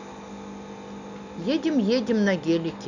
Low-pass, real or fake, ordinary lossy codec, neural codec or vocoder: 7.2 kHz; real; none; none